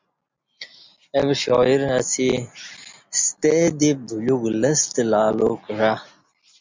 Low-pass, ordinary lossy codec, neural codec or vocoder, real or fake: 7.2 kHz; MP3, 64 kbps; none; real